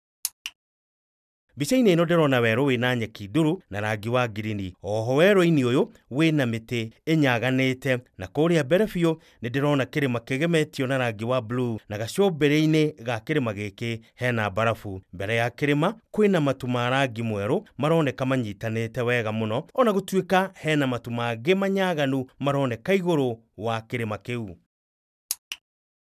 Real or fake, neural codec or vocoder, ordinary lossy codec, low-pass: real; none; none; 14.4 kHz